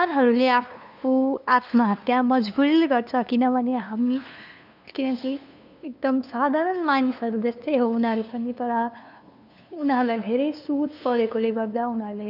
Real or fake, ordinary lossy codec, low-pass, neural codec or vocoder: fake; none; 5.4 kHz; codec, 16 kHz, 2 kbps, FunCodec, trained on LibriTTS, 25 frames a second